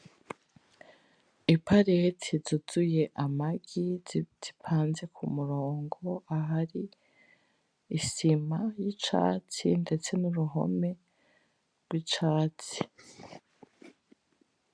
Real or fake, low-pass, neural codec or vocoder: real; 9.9 kHz; none